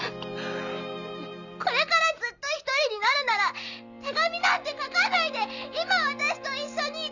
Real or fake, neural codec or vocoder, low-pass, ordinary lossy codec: real; none; 7.2 kHz; none